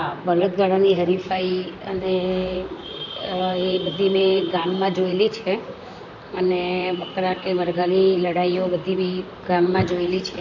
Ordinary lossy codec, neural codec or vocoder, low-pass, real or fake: none; vocoder, 44.1 kHz, 128 mel bands, Pupu-Vocoder; 7.2 kHz; fake